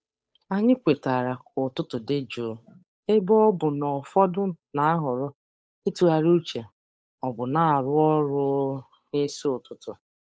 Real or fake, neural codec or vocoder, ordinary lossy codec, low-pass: fake; codec, 16 kHz, 8 kbps, FunCodec, trained on Chinese and English, 25 frames a second; none; none